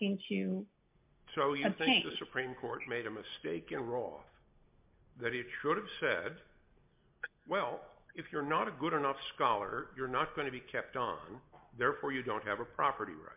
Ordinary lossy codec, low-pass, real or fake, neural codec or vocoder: MP3, 32 kbps; 3.6 kHz; real; none